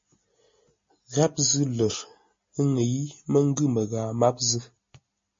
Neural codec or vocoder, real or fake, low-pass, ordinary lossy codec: none; real; 7.2 kHz; MP3, 32 kbps